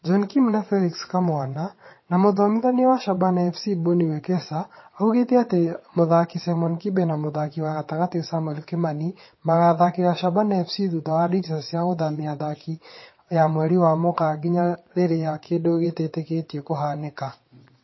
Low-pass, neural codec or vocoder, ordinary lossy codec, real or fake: 7.2 kHz; vocoder, 22.05 kHz, 80 mel bands, WaveNeXt; MP3, 24 kbps; fake